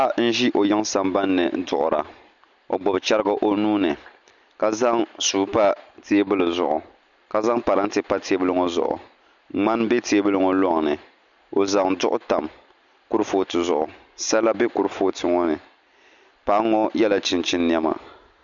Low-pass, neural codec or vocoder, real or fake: 7.2 kHz; none; real